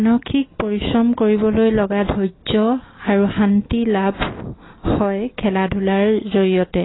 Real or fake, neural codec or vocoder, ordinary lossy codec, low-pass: real; none; AAC, 16 kbps; 7.2 kHz